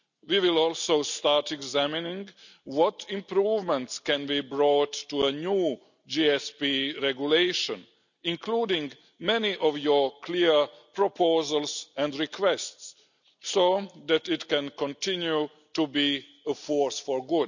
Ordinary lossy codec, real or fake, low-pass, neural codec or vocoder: none; real; 7.2 kHz; none